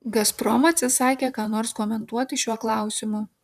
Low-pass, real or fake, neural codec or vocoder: 14.4 kHz; fake; vocoder, 44.1 kHz, 128 mel bands, Pupu-Vocoder